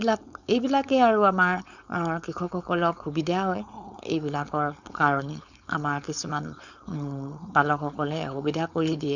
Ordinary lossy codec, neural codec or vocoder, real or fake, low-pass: none; codec, 16 kHz, 4.8 kbps, FACodec; fake; 7.2 kHz